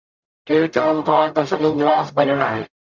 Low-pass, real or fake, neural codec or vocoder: 7.2 kHz; fake; codec, 44.1 kHz, 0.9 kbps, DAC